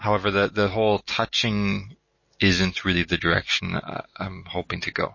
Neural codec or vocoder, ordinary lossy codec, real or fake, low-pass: none; MP3, 32 kbps; real; 7.2 kHz